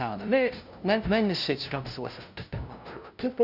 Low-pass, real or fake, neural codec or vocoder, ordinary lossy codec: 5.4 kHz; fake; codec, 16 kHz, 0.5 kbps, FunCodec, trained on LibriTTS, 25 frames a second; none